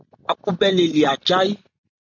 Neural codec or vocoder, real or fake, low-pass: none; real; 7.2 kHz